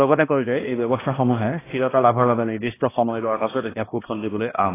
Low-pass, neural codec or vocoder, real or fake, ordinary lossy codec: 3.6 kHz; codec, 16 kHz, 1 kbps, X-Codec, HuBERT features, trained on balanced general audio; fake; AAC, 16 kbps